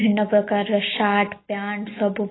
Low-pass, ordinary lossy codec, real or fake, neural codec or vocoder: 7.2 kHz; AAC, 16 kbps; real; none